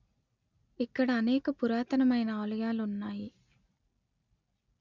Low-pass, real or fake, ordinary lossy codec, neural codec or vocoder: 7.2 kHz; real; none; none